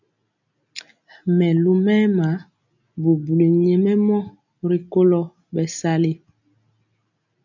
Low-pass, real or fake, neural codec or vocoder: 7.2 kHz; real; none